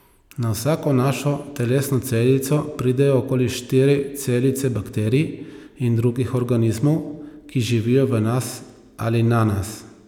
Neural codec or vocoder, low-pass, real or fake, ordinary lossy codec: none; 19.8 kHz; real; none